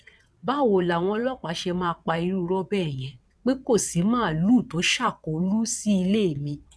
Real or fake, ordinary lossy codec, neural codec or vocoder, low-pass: fake; none; vocoder, 22.05 kHz, 80 mel bands, WaveNeXt; none